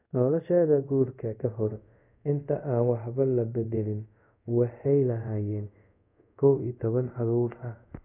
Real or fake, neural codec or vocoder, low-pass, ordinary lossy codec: fake; codec, 24 kHz, 0.5 kbps, DualCodec; 3.6 kHz; none